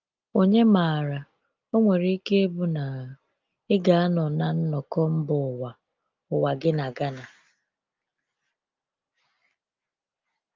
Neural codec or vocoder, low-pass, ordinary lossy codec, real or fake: none; 7.2 kHz; Opus, 24 kbps; real